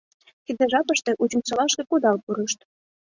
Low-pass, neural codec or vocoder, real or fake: 7.2 kHz; none; real